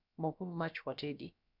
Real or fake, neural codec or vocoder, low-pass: fake; codec, 16 kHz, about 1 kbps, DyCAST, with the encoder's durations; 5.4 kHz